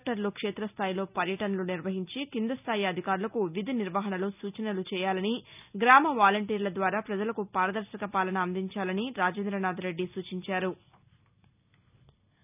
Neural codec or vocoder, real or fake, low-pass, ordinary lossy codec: none; real; 3.6 kHz; none